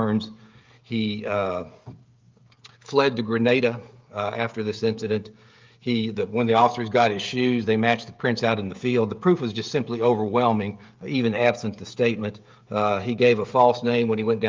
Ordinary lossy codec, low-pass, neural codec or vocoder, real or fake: Opus, 32 kbps; 7.2 kHz; codec, 16 kHz, 16 kbps, FreqCodec, smaller model; fake